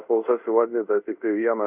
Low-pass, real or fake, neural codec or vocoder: 3.6 kHz; fake; codec, 24 kHz, 0.5 kbps, DualCodec